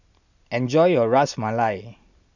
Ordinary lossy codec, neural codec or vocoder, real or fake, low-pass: none; none; real; 7.2 kHz